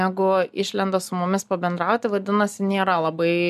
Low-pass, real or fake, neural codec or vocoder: 14.4 kHz; real; none